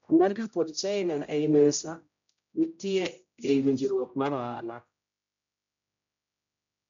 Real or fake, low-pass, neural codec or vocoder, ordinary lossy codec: fake; 7.2 kHz; codec, 16 kHz, 0.5 kbps, X-Codec, HuBERT features, trained on general audio; MP3, 64 kbps